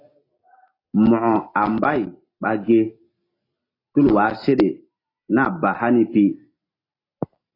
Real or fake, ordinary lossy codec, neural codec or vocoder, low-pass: real; AAC, 24 kbps; none; 5.4 kHz